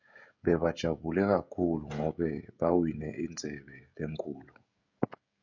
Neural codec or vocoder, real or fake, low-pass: codec, 16 kHz, 16 kbps, FreqCodec, smaller model; fake; 7.2 kHz